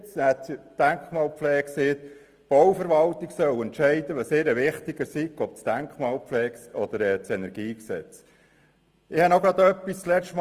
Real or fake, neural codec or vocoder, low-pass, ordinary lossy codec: real; none; 14.4 kHz; Opus, 24 kbps